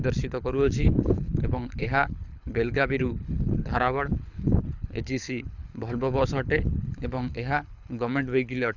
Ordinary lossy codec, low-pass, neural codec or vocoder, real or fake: none; 7.2 kHz; codec, 24 kHz, 6 kbps, HILCodec; fake